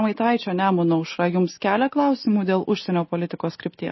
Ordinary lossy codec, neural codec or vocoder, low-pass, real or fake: MP3, 24 kbps; none; 7.2 kHz; real